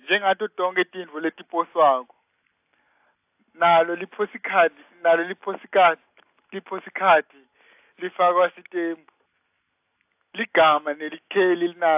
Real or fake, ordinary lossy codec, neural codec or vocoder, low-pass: real; AAC, 32 kbps; none; 3.6 kHz